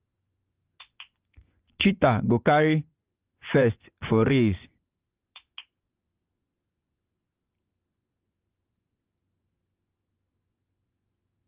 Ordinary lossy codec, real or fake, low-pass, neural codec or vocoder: Opus, 32 kbps; fake; 3.6 kHz; vocoder, 24 kHz, 100 mel bands, Vocos